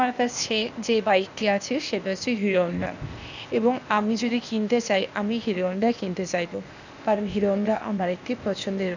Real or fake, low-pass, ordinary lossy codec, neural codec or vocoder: fake; 7.2 kHz; none; codec, 16 kHz, 0.8 kbps, ZipCodec